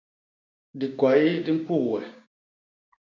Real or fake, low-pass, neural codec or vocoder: fake; 7.2 kHz; codec, 16 kHz, 6 kbps, DAC